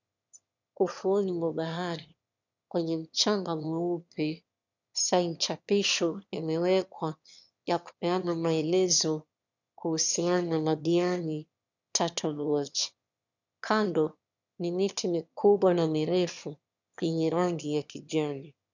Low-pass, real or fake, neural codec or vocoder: 7.2 kHz; fake; autoencoder, 22.05 kHz, a latent of 192 numbers a frame, VITS, trained on one speaker